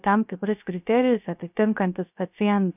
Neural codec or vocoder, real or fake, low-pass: codec, 16 kHz, 0.3 kbps, FocalCodec; fake; 3.6 kHz